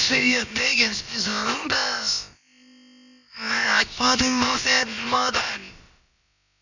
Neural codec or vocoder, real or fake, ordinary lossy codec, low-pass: codec, 16 kHz, about 1 kbps, DyCAST, with the encoder's durations; fake; none; 7.2 kHz